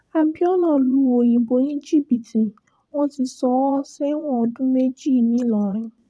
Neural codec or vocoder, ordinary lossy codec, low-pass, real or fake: vocoder, 22.05 kHz, 80 mel bands, Vocos; none; none; fake